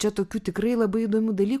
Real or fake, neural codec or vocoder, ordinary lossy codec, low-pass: real; none; MP3, 96 kbps; 14.4 kHz